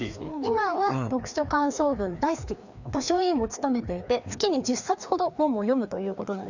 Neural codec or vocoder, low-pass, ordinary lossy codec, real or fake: codec, 16 kHz, 2 kbps, FreqCodec, larger model; 7.2 kHz; none; fake